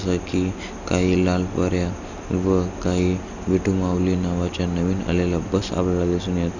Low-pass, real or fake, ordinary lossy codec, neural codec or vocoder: 7.2 kHz; real; none; none